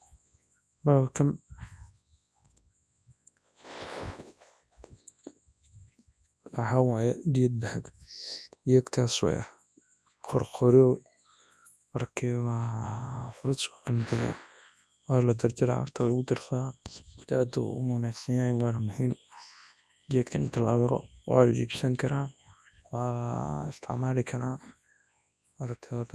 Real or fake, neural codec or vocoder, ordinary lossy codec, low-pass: fake; codec, 24 kHz, 0.9 kbps, WavTokenizer, large speech release; none; none